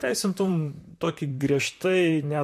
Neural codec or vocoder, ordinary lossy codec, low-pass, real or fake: vocoder, 44.1 kHz, 128 mel bands, Pupu-Vocoder; MP3, 64 kbps; 14.4 kHz; fake